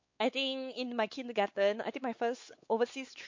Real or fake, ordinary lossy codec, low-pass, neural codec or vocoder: fake; MP3, 48 kbps; 7.2 kHz; codec, 16 kHz, 4 kbps, X-Codec, WavLM features, trained on Multilingual LibriSpeech